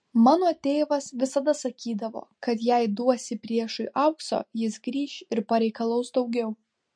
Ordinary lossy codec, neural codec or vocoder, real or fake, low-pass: MP3, 48 kbps; none; real; 9.9 kHz